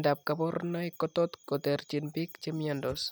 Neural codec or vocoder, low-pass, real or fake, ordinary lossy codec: none; none; real; none